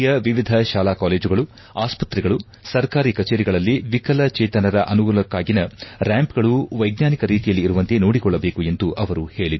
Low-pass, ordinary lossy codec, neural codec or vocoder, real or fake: 7.2 kHz; MP3, 24 kbps; none; real